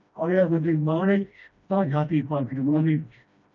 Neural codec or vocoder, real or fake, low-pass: codec, 16 kHz, 1 kbps, FreqCodec, smaller model; fake; 7.2 kHz